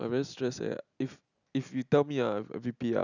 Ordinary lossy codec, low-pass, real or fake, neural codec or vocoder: none; 7.2 kHz; real; none